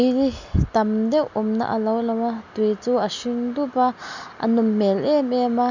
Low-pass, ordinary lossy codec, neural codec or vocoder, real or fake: 7.2 kHz; none; none; real